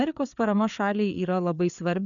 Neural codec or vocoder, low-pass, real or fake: codec, 16 kHz, 4 kbps, FreqCodec, larger model; 7.2 kHz; fake